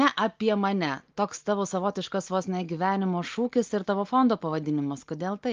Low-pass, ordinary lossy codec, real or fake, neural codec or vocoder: 7.2 kHz; Opus, 32 kbps; real; none